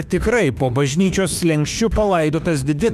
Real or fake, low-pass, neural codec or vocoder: fake; 14.4 kHz; autoencoder, 48 kHz, 32 numbers a frame, DAC-VAE, trained on Japanese speech